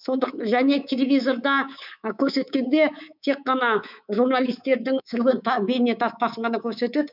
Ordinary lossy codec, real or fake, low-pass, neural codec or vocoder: none; fake; 5.4 kHz; codec, 24 kHz, 3.1 kbps, DualCodec